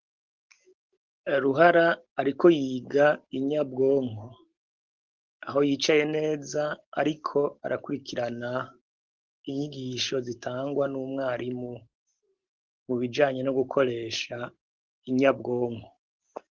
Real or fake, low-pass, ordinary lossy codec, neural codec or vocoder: real; 7.2 kHz; Opus, 16 kbps; none